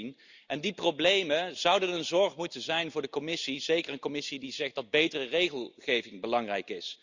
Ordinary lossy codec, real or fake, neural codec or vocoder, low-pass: Opus, 64 kbps; real; none; 7.2 kHz